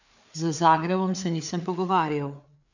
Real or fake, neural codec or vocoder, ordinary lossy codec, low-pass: fake; codec, 16 kHz, 4 kbps, FreqCodec, larger model; none; 7.2 kHz